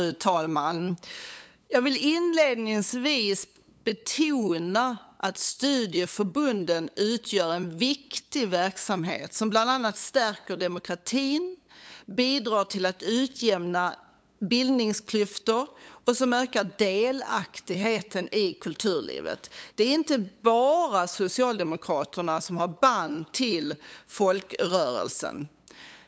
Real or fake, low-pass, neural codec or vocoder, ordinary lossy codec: fake; none; codec, 16 kHz, 8 kbps, FunCodec, trained on LibriTTS, 25 frames a second; none